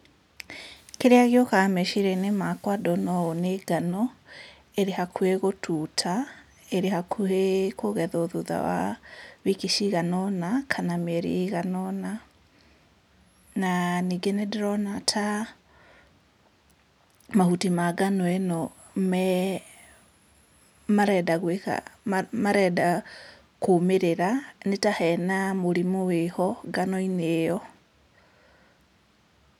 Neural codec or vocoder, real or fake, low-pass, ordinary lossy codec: none; real; 19.8 kHz; none